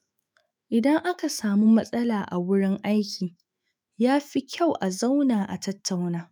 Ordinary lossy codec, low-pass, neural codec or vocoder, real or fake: none; none; autoencoder, 48 kHz, 128 numbers a frame, DAC-VAE, trained on Japanese speech; fake